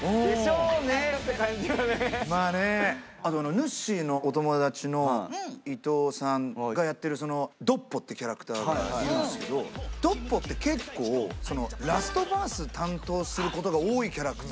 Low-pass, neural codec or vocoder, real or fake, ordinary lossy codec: none; none; real; none